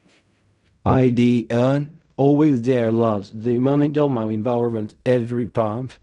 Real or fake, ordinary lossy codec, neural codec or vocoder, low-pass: fake; none; codec, 16 kHz in and 24 kHz out, 0.4 kbps, LongCat-Audio-Codec, fine tuned four codebook decoder; 10.8 kHz